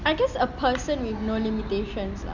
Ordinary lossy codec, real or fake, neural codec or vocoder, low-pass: none; real; none; 7.2 kHz